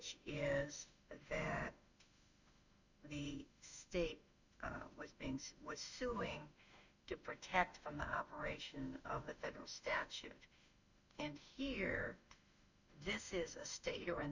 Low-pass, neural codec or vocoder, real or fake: 7.2 kHz; autoencoder, 48 kHz, 32 numbers a frame, DAC-VAE, trained on Japanese speech; fake